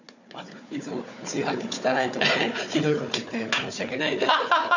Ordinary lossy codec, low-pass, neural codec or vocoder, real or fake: none; 7.2 kHz; codec, 16 kHz, 4 kbps, FunCodec, trained on Chinese and English, 50 frames a second; fake